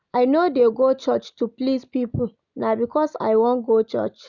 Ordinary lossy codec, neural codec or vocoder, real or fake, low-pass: none; none; real; 7.2 kHz